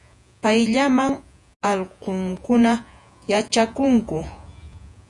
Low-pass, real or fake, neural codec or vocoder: 10.8 kHz; fake; vocoder, 48 kHz, 128 mel bands, Vocos